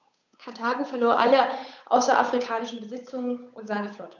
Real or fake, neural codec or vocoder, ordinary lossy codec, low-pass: fake; codec, 16 kHz, 8 kbps, FunCodec, trained on Chinese and English, 25 frames a second; none; 7.2 kHz